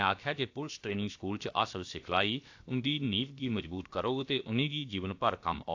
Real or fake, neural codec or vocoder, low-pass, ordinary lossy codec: fake; codec, 16 kHz, about 1 kbps, DyCAST, with the encoder's durations; 7.2 kHz; AAC, 48 kbps